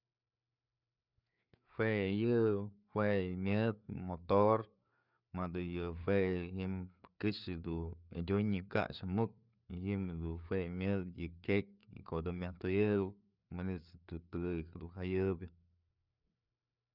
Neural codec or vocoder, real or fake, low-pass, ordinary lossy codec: codec, 16 kHz, 4 kbps, FreqCodec, larger model; fake; 5.4 kHz; none